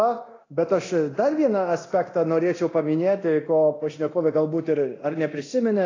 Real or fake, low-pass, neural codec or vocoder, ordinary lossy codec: fake; 7.2 kHz; codec, 24 kHz, 0.9 kbps, DualCodec; AAC, 32 kbps